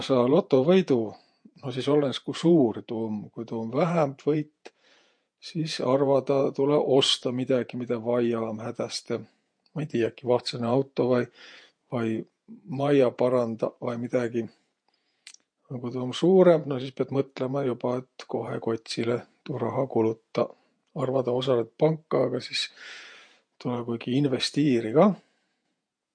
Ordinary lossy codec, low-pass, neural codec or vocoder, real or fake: MP3, 48 kbps; 9.9 kHz; vocoder, 48 kHz, 128 mel bands, Vocos; fake